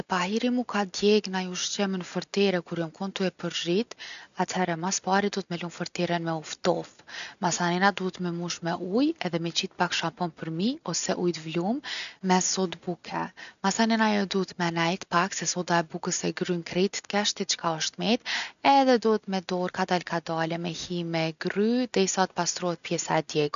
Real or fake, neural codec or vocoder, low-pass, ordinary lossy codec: real; none; 7.2 kHz; none